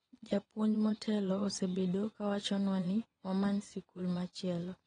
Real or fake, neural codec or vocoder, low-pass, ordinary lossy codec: fake; vocoder, 22.05 kHz, 80 mel bands, Vocos; 9.9 kHz; AAC, 32 kbps